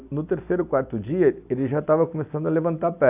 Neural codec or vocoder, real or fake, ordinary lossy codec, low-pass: none; real; AAC, 32 kbps; 3.6 kHz